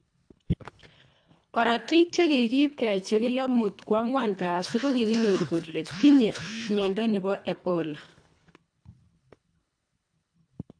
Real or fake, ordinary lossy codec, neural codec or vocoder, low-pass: fake; AAC, 64 kbps; codec, 24 kHz, 1.5 kbps, HILCodec; 9.9 kHz